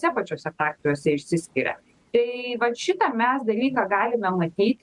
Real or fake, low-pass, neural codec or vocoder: real; 10.8 kHz; none